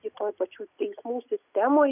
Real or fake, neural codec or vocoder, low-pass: real; none; 3.6 kHz